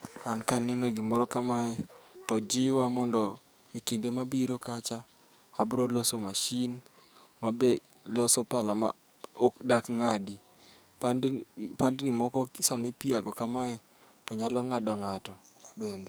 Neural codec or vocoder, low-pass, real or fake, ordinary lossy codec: codec, 44.1 kHz, 2.6 kbps, SNAC; none; fake; none